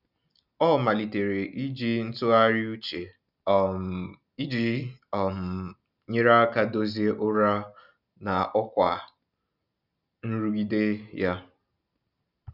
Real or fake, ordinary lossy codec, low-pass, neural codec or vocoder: real; none; 5.4 kHz; none